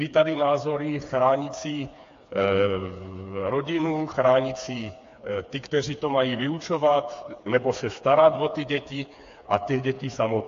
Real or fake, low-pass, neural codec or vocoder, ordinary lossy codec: fake; 7.2 kHz; codec, 16 kHz, 4 kbps, FreqCodec, smaller model; AAC, 48 kbps